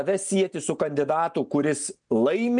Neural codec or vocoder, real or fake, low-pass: none; real; 9.9 kHz